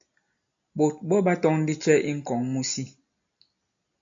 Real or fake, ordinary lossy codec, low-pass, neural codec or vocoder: real; AAC, 64 kbps; 7.2 kHz; none